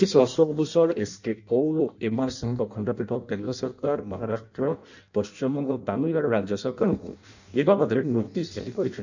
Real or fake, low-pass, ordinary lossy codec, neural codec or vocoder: fake; 7.2 kHz; none; codec, 16 kHz in and 24 kHz out, 0.6 kbps, FireRedTTS-2 codec